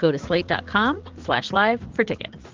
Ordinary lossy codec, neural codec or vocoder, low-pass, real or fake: Opus, 16 kbps; vocoder, 44.1 kHz, 80 mel bands, Vocos; 7.2 kHz; fake